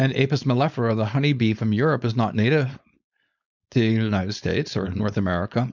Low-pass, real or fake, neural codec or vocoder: 7.2 kHz; fake; codec, 16 kHz, 4.8 kbps, FACodec